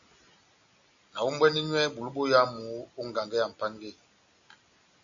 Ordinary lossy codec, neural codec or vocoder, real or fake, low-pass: AAC, 64 kbps; none; real; 7.2 kHz